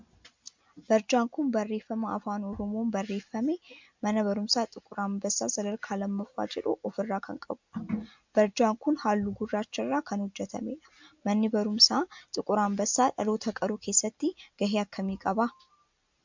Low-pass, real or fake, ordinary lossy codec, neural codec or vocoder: 7.2 kHz; real; MP3, 64 kbps; none